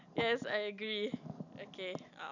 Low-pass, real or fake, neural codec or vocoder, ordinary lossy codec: 7.2 kHz; real; none; none